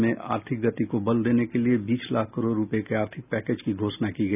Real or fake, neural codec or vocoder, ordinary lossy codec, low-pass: real; none; none; 3.6 kHz